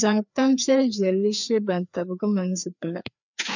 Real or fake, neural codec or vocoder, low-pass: fake; codec, 16 kHz, 2 kbps, FreqCodec, larger model; 7.2 kHz